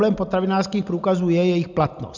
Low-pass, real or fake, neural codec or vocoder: 7.2 kHz; real; none